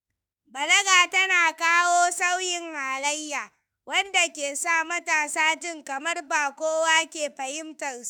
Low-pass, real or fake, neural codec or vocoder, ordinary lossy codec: none; fake; autoencoder, 48 kHz, 32 numbers a frame, DAC-VAE, trained on Japanese speech; none